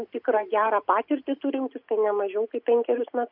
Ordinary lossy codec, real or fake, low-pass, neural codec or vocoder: MP3, 48 kbps; real; 5.4 kHz; none